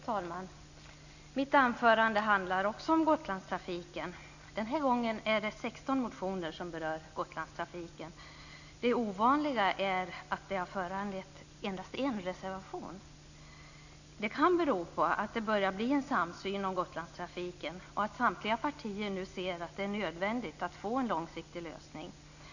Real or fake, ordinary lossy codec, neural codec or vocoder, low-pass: real; none; none; 7.2 kHz